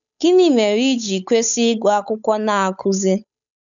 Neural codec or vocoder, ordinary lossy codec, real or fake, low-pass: codec, 16 kHz, 8 kbps, FunCodec, trained on Chinese and English, 25 frames a second; none; fake; 7.2 kHz